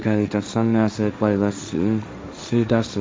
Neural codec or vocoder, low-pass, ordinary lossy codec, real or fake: codec, 16 kHz, 1.1 kbps, Voila-Tokenizer; none; none; fake